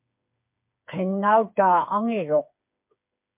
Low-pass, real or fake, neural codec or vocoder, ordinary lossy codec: 3.6 kHz; fake; codec, 16 kHz, 4 kbps, FreqCodec, smaller model; MP3, 32 kbps